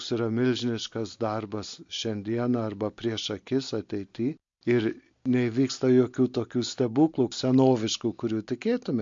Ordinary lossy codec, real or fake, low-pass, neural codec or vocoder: MP3, 48 kbps; real; 7.2 kHz; none